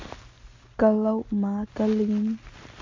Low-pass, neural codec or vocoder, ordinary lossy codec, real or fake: 7.2 kHz; none; MP3, 48 kbps; real